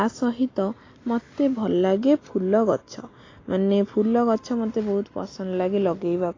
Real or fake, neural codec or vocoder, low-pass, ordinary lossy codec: real; none; 7.2 kHz; AAC, 32 kbps